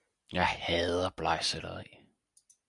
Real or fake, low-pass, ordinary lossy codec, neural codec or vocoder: real; 10.8 kHz; MP3, 96 kbps; none